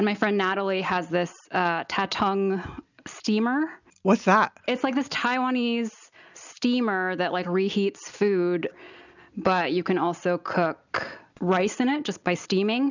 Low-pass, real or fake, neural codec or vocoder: 7.2 kHz; real; none